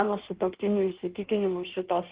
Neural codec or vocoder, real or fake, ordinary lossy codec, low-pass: codec, 16 kHz in and 24 kHz out, 1.1 kbps, FireRedTTS-2 codec; fake; Opus, 16 kbps; 3.6 kHz